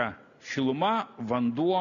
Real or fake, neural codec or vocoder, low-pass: real; none; 7.2 kHz